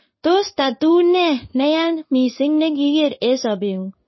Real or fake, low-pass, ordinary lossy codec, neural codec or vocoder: fake; 7.2 kHz; MP3, 24 kbps; codec, 16 kHz in and 24 kHz out, 1 kbps, XY-Tokenizer